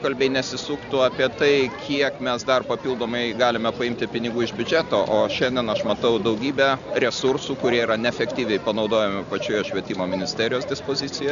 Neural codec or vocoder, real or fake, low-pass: none; real; 7.2 kHz